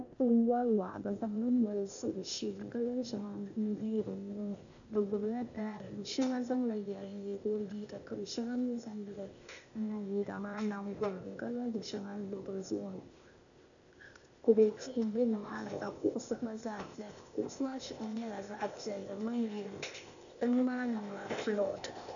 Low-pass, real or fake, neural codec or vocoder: 7.2 kHz; fake; codec, 16 kHz, 0.8 kbps, ZipCodec